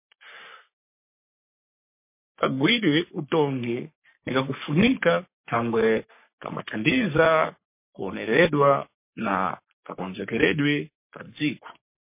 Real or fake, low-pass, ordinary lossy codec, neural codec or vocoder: fake; 3.6 kHz; MP3, 24 kbps; codec, 44.1 kHz, 3.4 kbps, Pupu-Codec